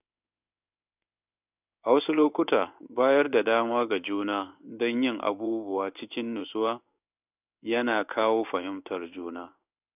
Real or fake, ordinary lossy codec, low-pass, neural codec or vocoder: fake; none; 3.6 kHz; codec, 16 kHz in and 24 kHz out, 1 kbps, XY-Tokenizer